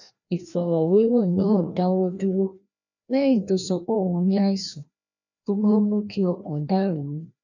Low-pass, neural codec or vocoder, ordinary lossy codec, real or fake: 7.2 kHz; codec, 16 kHz, 1 kbps, FreqCodec, larger model; none; fake